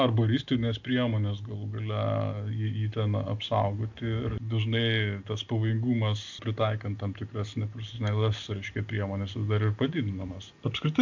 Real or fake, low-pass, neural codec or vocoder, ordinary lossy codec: real; 7.2 kHz; none; MP3, 64 kbps